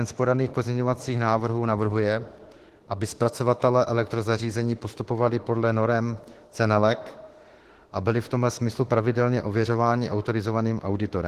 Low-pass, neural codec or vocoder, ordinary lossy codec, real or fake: 14.4 kHz; autoencoder, 48 kHz, 32 numbers a frame, DAC-VAE, trained on Japanese speech; Opus, 16 kbps; fake